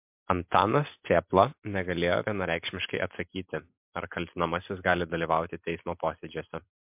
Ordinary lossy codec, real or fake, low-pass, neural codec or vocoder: MP3, 32 kbps; real; 3.6 kHz; none